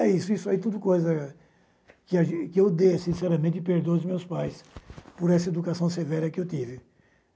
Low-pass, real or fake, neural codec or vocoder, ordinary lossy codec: none; real; none; none